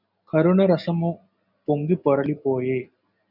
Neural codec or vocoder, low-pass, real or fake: none; 5.4 kHz; real